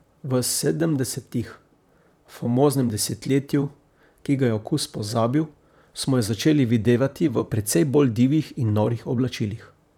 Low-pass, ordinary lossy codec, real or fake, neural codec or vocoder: 19.8 kHz; none; fake; vocoder, 44.1 kHz, 128 mel bands, Pupu-Vocoder